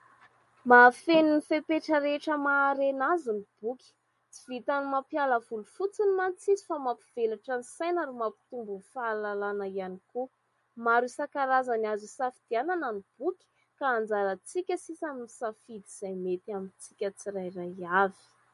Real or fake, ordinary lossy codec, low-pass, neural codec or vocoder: real; MP3, 48 kbps; 14.4 kHz; none